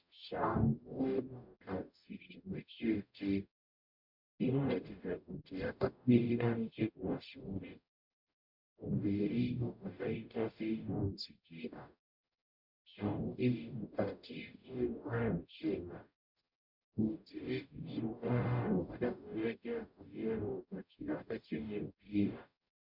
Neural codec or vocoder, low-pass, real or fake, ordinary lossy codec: codec, 44.1 kHz, 0.9 kbps, DAC; 5.4 kHz; fake; MP3, 48 kbps